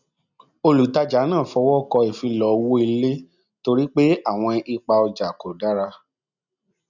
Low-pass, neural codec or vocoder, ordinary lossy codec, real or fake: 7.2 kHz; none; none; real